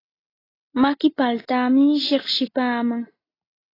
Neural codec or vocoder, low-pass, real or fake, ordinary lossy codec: none; 5.4 kHz; real; AAC, 24 kbps